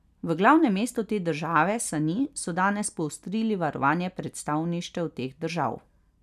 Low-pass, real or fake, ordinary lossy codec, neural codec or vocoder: 14.4 kHz; real; none; none